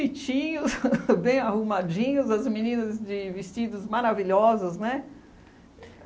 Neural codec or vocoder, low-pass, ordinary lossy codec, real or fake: none; none; none; real